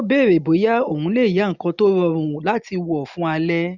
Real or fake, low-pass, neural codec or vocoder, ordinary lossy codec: real; 7.2 kHz; none; none